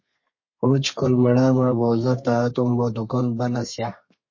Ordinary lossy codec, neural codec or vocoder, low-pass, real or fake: MP3, 32 kbps; codec, 32 kHz, 1.9 kbps, SNAC; 7.2 kHz; fake